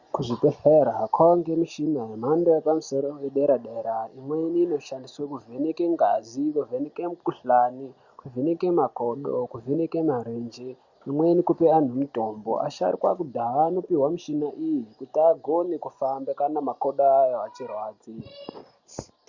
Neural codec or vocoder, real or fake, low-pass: none; real; 7.2 kHz